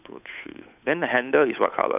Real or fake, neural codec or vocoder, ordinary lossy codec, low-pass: fake; codec, 16 kHz, 8 kbps, FunCodec, trained on Chinese and English, 25 frames a second; none; 3.6 kHz